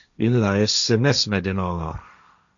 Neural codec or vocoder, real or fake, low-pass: codec, 16 kHz, 1.1 kbps, Voila-Tokenizer; fake; 7.2 kHz